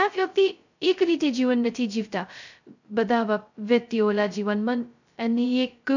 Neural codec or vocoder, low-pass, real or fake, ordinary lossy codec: codec, 16 kHz, 0.2 kbps, FocalCodec; 7.2 kHz; fake; none